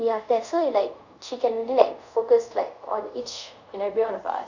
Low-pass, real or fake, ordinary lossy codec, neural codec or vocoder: 7.2 kHz; fake; none; codec, 24 kHz, 0.5 kbps, DualCodec